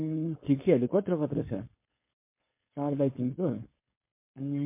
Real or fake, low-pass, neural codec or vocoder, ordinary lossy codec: fake; 3.6 kHz; codec, 16 kHz, 4.8 kbps, FACodec; MP3, 24 kbps